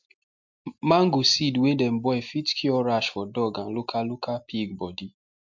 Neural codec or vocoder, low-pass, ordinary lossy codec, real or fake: none; 7.2 kHz; MP3, 64 kbps; real